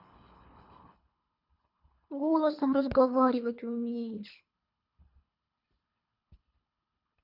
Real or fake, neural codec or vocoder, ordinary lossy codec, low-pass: fake; codec, 24 kHz, 3 kbps, HILCodec; none; 5.4 kHz